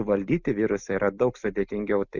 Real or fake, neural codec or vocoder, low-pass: real; none; 7.2 kHz